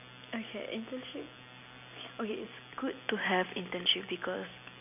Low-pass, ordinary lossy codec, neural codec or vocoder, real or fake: 3.6 kHz; none; none; real